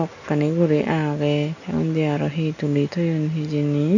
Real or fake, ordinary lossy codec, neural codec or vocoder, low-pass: real; none; none; 7.2 kHz